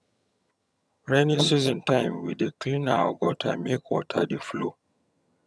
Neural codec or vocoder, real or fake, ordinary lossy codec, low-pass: vocoder, 22.05 kHz, 80 mel bands, HiFi-GAN; fake; none; none